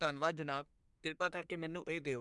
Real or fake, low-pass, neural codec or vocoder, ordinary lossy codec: fake; 10.8 kHz; codec, 24 kHz, 1 kbps, SNAC; MP3, 96 kbps